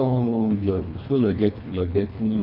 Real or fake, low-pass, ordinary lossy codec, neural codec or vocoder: fake; 5.4 kHz; none; codec, 24 kHz, 1.5 kbps, HILCodec